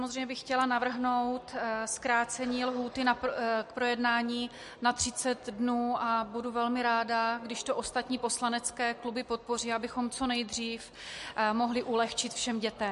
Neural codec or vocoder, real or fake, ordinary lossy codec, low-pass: none; real; MP3, 48 kbps; 14.4 kHz